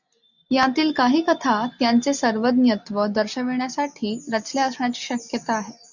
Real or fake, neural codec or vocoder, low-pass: real; none; 7.2 kHz